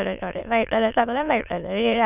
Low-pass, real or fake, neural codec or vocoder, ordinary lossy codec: 3.6 kHz; fake; autoencoder, 22.05 kHz, a latent of 192 numbers a frame, VITS, trained on many speakers; none